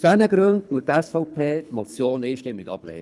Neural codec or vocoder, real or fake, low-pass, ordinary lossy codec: codec, 24 kHz, 3 kbps, HILCodec; fake; none; none